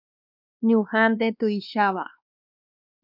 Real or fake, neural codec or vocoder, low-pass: fake; codec, 16 kHz, 2 kbps, X-Codec, WavLM features, trained on Multilingual LibriSpeech; 5.4 kHz